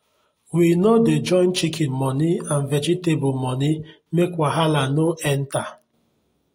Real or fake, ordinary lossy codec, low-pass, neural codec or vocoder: real; AAC, 48 kbps; 19.8 kHz; none